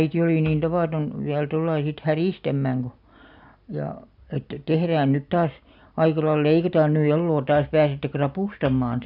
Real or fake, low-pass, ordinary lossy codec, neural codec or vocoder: real; 5.4 kHz; none; none